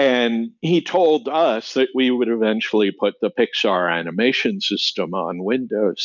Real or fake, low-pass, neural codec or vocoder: real; 7.2 kHz; none